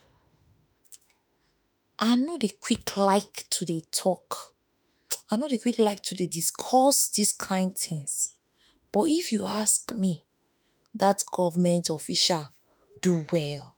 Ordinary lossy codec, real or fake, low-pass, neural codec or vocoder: none; fake; none; autoencoder, 48 kHz, 32 numbers a frame, DAC-VAE, trained on Japanese speech